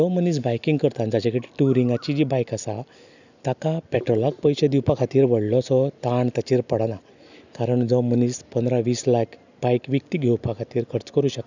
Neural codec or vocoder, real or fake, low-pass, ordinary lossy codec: none; real; 7.2 kHz; none